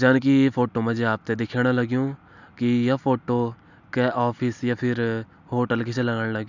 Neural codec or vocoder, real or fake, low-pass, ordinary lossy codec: none; real; 7.2 kHz; none